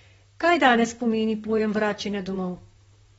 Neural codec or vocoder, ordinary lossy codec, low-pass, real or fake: vocoder, 44.1 kHz, 128 mel bands, Pupu-Vocoder; AAC, 24 kbps; 19.8 kHz; fake